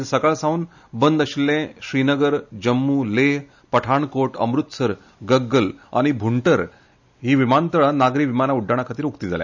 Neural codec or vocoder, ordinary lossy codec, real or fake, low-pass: none; none; real; 7.2 kHz